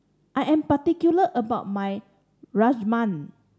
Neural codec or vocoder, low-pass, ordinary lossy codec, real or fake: none; none; none; real